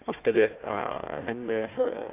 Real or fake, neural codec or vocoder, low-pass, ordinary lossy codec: fake; codec, 16 kHz, 1 kbps, FunCodec, trained on Chinese and English, 50 frames a second; 3.6 kHz; AAC, 24 kbps